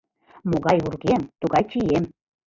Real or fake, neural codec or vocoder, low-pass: real; none; 7.2 kHz